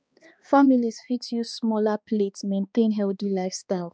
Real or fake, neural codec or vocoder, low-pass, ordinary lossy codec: fake; codec, 16 kHz, 2 kbps, X-Codec, HuBERT features, trained on balanced general audio; none; none